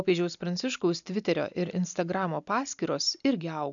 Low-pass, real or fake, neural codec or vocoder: 7.2 kHz; real; none